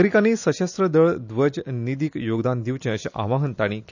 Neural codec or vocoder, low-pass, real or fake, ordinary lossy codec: none; 7.2 kHz; real; none